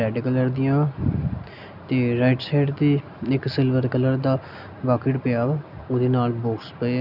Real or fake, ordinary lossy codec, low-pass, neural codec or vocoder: real; none; 5.4 kHz; none